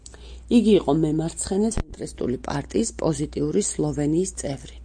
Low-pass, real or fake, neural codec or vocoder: 9.9 kHz; real; none